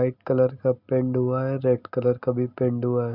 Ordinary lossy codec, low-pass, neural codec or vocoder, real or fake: none; 5.4 kHz; none; real